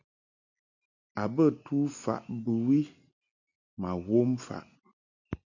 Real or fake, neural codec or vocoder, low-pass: real; none; 7.2 kHz